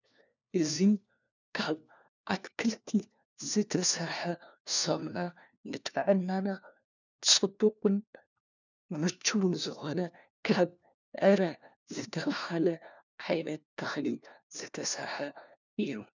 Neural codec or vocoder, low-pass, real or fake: codec, 16 kHz, 1 kbps, FunCodec, trained on LibriTTS, 50 frames a second; 7.2 kHz; fake